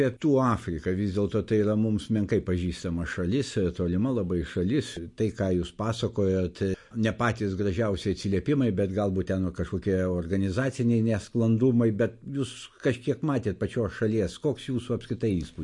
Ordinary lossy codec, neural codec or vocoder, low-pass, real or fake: MP3, 48 kbps; none; 10.8 kHz; real